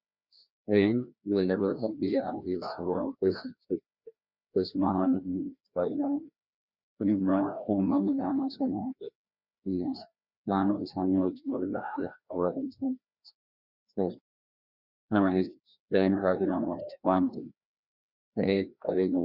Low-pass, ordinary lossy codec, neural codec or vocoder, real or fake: 5.4 kHz; Opus, 64 kbps; codec, 16 kHz, 1 kbps, FreqCodec, larger model; fake